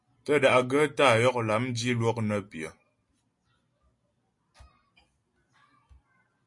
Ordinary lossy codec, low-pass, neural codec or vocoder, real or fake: MP3, 48 kbps; 10.8 kHz; none; real